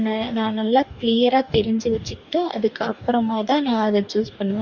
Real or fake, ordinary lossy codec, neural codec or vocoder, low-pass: fake; none; codec, 44.1 kHz, 2.6 kbps, DAC; 7.2 kHz